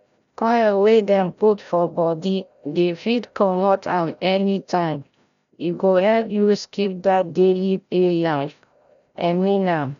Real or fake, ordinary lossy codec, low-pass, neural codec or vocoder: fake; none; 7.2 kHz; codec, 16 kHz, 0.5 kbps, FreqCodec, larger model